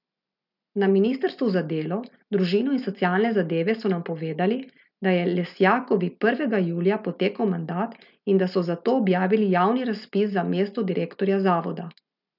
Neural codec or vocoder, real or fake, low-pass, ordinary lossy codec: none; real; 5.4 kHz; none